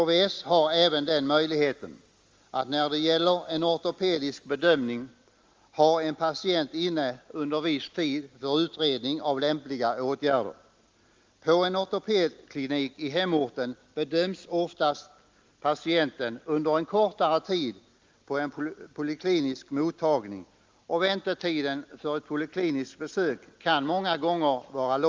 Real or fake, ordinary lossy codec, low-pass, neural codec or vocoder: real; Opus, 32 kbps; 7.2 kHz; none